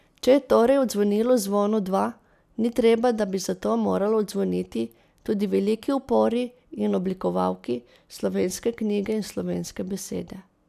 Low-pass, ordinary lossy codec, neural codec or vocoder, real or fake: 14.4 kHz; none; none; real